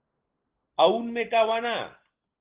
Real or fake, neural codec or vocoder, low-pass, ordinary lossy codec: real; none; 3.6 kHz; Opus, 16 kbps